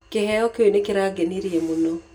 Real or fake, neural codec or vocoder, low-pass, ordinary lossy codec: real; none; 19.8 kHz; none